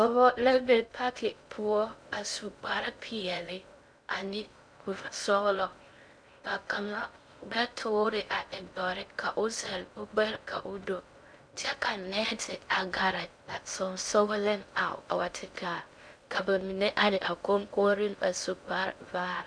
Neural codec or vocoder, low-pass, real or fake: codec, 16 kHz in and 24 kHz out, 0.6 kbps, FocalCodec, streaming, 2048 codes; 9.9 kHz; fake